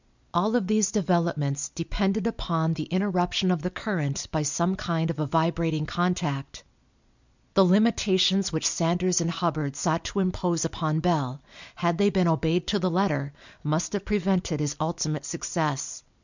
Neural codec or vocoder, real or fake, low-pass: none; real; 7.2 kHz